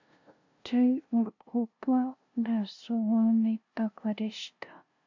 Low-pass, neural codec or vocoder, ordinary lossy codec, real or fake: 7.2 kHz; codec, 16 kHz, 0.5 kbps, FunCodec, trained on LibriTTS, 25 frames a second; MP3, 64 kbps; fake